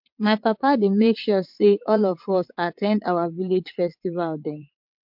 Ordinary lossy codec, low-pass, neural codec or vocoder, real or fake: MP3, 48 kbps; 5.4 kHz; vocoder, 22.05 kHz, 80 mel bands, WaveNeXt; fake